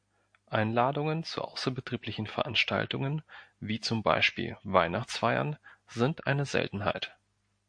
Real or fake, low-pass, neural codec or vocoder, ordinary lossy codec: real; 9.9 kHz; none; MP3, 48 kbps